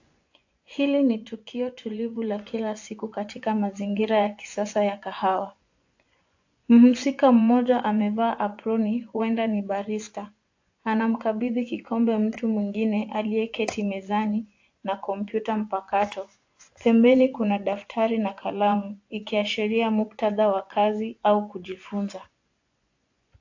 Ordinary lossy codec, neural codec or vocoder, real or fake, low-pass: AAC, 48 kbps; vocoder, 24 kHz, 100 mel bands, Vocos; fake; 7.2 kHz